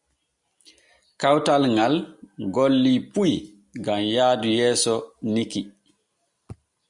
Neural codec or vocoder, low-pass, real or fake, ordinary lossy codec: none; 10.8 kHz; real; Opus, 64 kbps